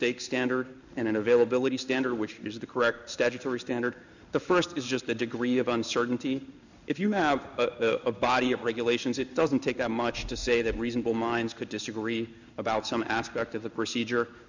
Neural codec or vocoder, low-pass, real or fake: codec, 16 kHz in and 24 kHz out, 1 kbps, XY-Tokenizer; 7.2 kHz; fake